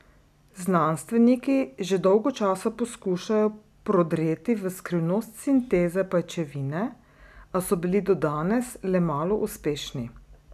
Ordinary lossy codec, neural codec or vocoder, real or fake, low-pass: none; none; real; 14.4 kHz